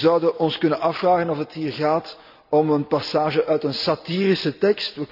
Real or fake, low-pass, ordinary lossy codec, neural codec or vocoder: fake; 5.4 kHz; none; vocoder, 44.1 kHz, 128 mel bands every 256 samples, BigVGAN v2